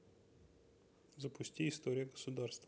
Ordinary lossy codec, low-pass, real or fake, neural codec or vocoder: none; none; real; none